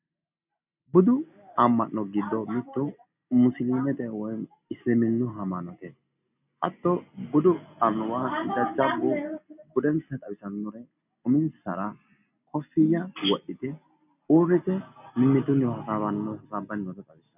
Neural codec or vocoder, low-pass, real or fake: none; 3.6 kHz; real